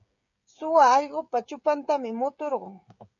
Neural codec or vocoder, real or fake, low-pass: codec, 16 kHz, 16 kbps, FreqCodec, smaller model; fake; 7.2 kHz